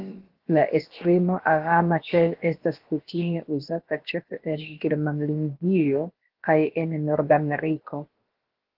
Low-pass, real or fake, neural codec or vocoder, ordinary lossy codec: 5.4 kHz; fake; codec, 16 kHz, about 1 kbps, DyCAST, with the encoder's durations; Opus, 16 kbps